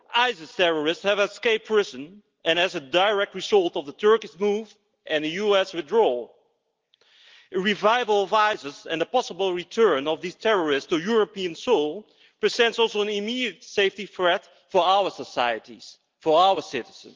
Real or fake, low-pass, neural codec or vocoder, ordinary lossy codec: real; 7.2 kHz; none; Opus, 24 kbps